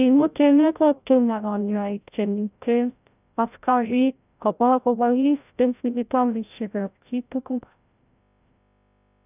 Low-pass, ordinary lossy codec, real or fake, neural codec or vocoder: 3.6 kHz; none; fake; codec, 16 kHz, 0.5 kbps, FreqCodec, larger model